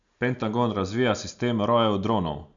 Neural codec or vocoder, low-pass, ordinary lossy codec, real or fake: none; 7.2 kHz; none; real